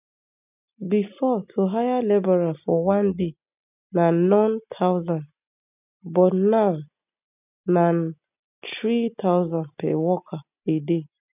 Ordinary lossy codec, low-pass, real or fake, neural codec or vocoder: none; 3.6 kHz; real; none